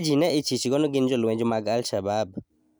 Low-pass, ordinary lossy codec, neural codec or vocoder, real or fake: none; none; none; real